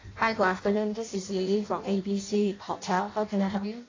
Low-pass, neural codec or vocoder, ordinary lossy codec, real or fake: 7.2 kHz; codec, 16 kHz in and 24 kHz out, 0.6 kbps, FireRedTTS-2 codec; AAC, 32 kbps; fake